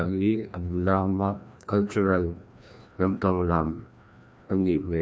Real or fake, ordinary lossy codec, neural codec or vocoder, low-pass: fake; none; codec, 16 kHz, 1 kbps, FreqCodec, larger model; none